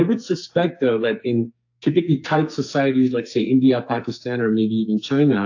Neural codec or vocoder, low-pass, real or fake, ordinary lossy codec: codec, 44.1 kHz, 2.6 kbps, SNAC; 7.2 kHz; fake; AAC, 48 kbps